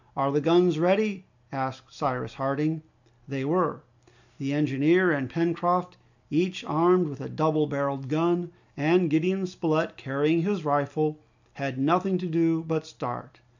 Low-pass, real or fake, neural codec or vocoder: 7.2 kHz; real; none